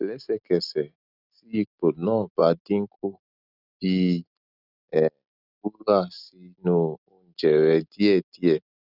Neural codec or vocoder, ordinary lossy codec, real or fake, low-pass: none; none; real; 5.4 kHz